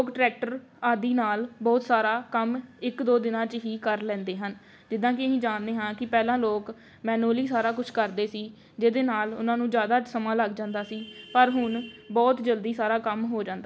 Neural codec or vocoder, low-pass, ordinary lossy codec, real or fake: none; none; none; real